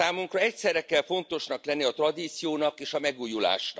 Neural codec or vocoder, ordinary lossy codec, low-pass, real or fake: none; none; none; real